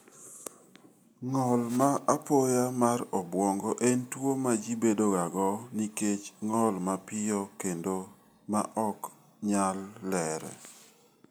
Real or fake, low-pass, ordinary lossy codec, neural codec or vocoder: real; none; none; none